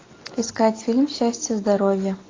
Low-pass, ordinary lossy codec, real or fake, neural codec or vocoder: 7.2 kHz; AAC, 32 kbps; real; none